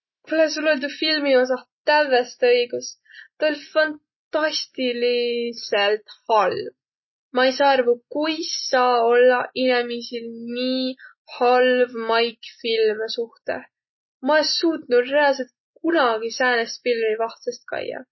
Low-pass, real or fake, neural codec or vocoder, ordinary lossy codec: 7.2 kHz; real; none; MP3, 24 kbps